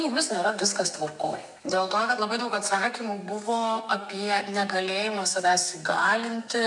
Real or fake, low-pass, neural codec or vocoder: fake; 10.8 kHz; codec, 32 kHz, 1.9 kbps, SNAC